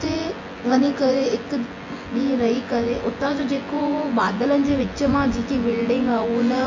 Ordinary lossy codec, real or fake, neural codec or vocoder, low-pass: MP3, 32 kbps; fake; vocoder, 24 kHz, 100 mel bands, Vocos; 7.2 kHz